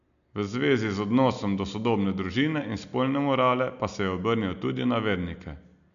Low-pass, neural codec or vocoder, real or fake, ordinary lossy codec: 7.2 kHz; none; real; none